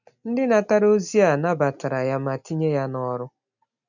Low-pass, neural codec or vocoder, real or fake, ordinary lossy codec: 7.2 kHz; none; real; none